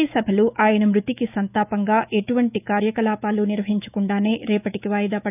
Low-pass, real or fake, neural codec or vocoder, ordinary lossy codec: 3.6 kHz; fake; autoencoder, 48 kHz, 128 numbers a frame, DAC-VAE, trained on Japanese speech; none